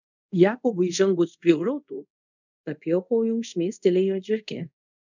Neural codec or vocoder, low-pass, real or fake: codec, 24 kHz, 0.5 kbps, DualCodec; 7.2 kHz; fake